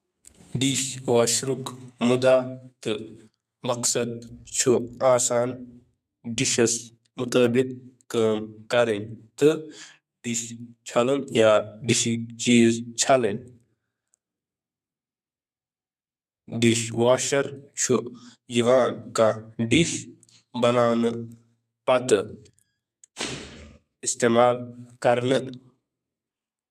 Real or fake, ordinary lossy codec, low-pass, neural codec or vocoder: fake; none; 14.4 kHz; codec, 32 kHz, 1.9 kbps, SNAC